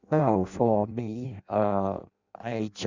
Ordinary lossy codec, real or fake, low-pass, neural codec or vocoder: Opus, 64 kbps; fake; 7.2 kHz; codec, 16 kHz in and 24 kHz out, 0.6 kbps, FireRedTTS-2 codec